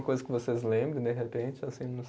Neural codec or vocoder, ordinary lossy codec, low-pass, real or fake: none; none; none; real